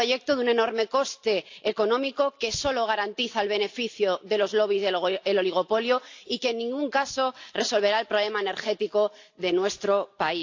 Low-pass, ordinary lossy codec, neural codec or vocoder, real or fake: 7.2 kHz; AAC, 48 kbps; none; real